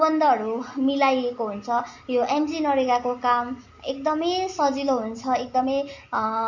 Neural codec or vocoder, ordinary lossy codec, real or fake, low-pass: none; MP3, 48 kbps; real; 7.2 kHz